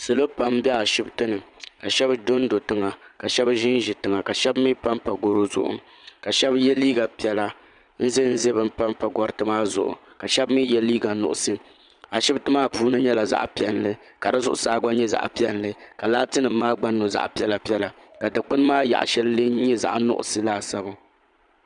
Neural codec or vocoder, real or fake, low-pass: vocoder, 22.05 kHz, 80 mel bands, Vocos; fake; 9.9 kHz